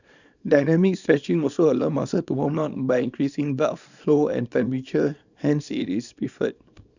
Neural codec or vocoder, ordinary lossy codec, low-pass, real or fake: codec, 24 kHz, 0.9 kbps, WavTokenizer, small release; none; 7.2 kHz; fake